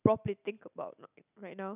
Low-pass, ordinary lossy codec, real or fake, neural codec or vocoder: 3.6 kHz; none; real; none